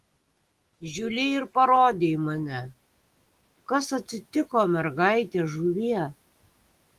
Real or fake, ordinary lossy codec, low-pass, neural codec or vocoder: fake; Opus, 24 kbps; 14.4 kHz; autoencoder, 48 kHz, 128 numbers a frame, DAC-VAE, trained on Japanese speech